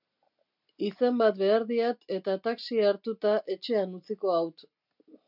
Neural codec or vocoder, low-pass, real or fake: none; 5.4 kHz; real